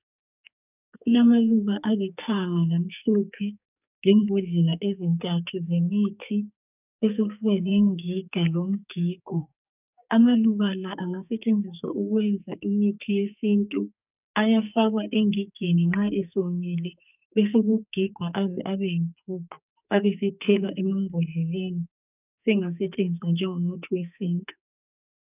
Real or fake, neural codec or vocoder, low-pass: fake; codec, 44.1 kHz, 2.6 kbps, SNAC; 3.6 kHz